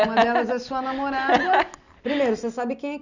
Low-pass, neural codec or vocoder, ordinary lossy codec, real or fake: 7.2 kHz; none; none; real